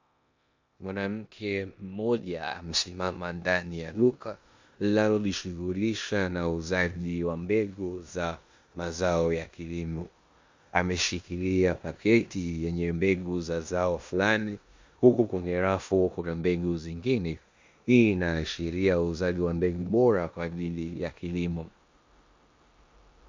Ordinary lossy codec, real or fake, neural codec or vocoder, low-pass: MP3, 64 kbps; fake; codec, 16 kHz in and 24 kHz out, 0.9 kbps, LongCat-Audio-Codec, four codebook decoder; 7.2 kHz